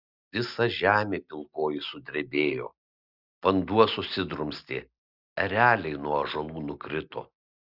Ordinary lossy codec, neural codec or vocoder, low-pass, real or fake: Opus, 64 kbps; none; 5.4 kHz; real